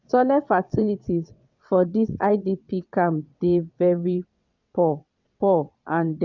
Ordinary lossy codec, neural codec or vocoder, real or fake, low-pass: none; vocoder, 22.05 kHz, 80 mel bands, WaveNeXt; fake; 7.2 kHz